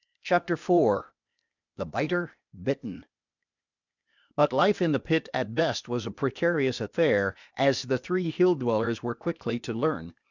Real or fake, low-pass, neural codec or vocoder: fake; 7.2 kHz; codec, 16 kHz, 0.8 kbps, ZipCodec